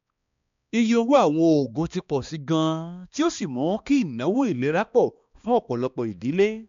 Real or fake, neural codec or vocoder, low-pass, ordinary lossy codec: fake; codec, 16 kHz, 2 kbps, X-Codec, HuBERT features, trained on balanced general audio; 7.2 kHz; MP3, 64 kbps